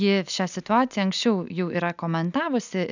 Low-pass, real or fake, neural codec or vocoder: 7.2 kHz; real; none